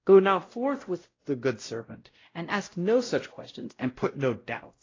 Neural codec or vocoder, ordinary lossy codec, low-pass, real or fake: codec, 16 kHz, 0.5 kbps, X-Codec, WavLM features, trained on Multilingual LibriSpeech; AAC, 32 kbps; 7.2 kHz; fake